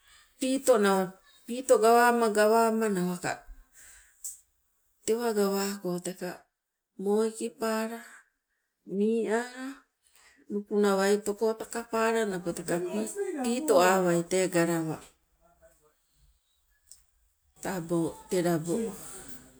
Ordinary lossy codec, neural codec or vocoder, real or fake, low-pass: none; none; real; none